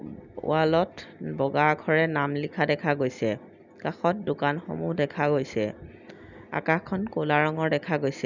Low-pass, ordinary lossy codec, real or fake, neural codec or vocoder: 7.2 kHz; none; real; none